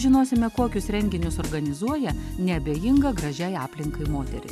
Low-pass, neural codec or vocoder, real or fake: 14.4 kHz; none; real